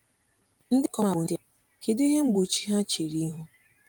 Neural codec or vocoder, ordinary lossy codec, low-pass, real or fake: vocoder, 44.1 kHz, 128 mel bands every 512 samples, BigVGAN v2; Opus, 32 kbps; 19.8 kHz; fake